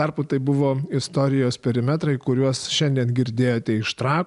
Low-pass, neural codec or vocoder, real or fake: 10.8 kHz; none; real